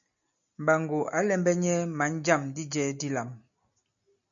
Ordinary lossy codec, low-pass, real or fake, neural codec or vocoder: MP3, 96 kbps; 7.2 kHz; real; none